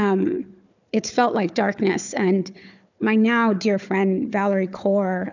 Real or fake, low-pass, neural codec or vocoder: fake; 7.2 kHz; codec, 16 kHz, 4 kbps, FunCodec, trained on Chinese and English, 50 frames a second